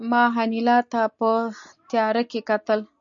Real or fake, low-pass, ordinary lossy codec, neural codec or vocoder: real; 7.2 kHz; AAC, 64 kbps; none